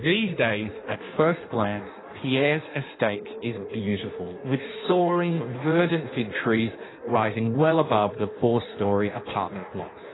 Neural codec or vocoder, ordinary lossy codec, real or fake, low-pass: codec, 16 kHz in and 24 kHz out, 1.1 kbps, FireRedTTS-2 codec; AAC, 16 kbps; fake; 7.2 kHz